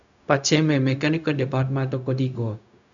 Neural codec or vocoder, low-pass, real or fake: codec, 16 kHz, 0.4 kbps, LongCat-Audio-Codec; 7.2 kHz; fake